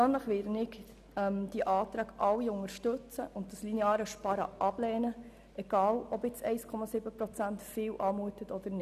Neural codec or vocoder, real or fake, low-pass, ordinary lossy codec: none; real; 14.4 kHz; none